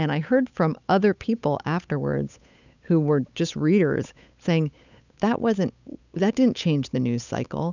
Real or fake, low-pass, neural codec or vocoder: fake; 7.2 kHz; codec, 16 kHz, 8 kbps, FunCodec, trained on Chinese and English, 25 frames a second